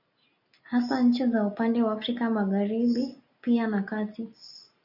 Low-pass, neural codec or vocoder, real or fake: 5.4 kHz; none; real